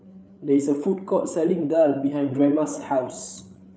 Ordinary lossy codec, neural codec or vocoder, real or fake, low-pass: none; codec, 16 kHz, 8 kbps, FreqCodec, larger model; fake; none